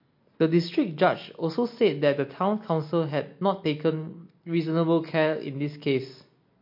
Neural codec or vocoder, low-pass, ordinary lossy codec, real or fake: none; 5.4 kHz; MP3, 32 kbps; real